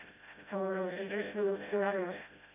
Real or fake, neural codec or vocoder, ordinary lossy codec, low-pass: fake; codec, 16 kHz, 0.5 kbps, FreqCodec, smaller model; none; 3.6 kHz